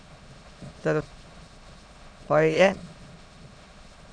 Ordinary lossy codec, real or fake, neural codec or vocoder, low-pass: MP3, 96 kbps; fake; autoencoder, 22.05 kHz, a latent of 192 numbers a frame, VITS, trained on many speakers; 9.9 kHz